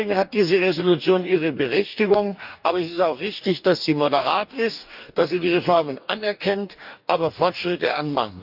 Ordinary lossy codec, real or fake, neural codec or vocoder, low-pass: none; fake; codec, 44.1 kHz, 2.6 kbps, DAC; 5.4 kHz